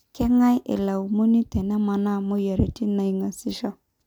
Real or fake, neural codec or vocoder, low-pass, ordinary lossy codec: real; none; 19.8 kHz; none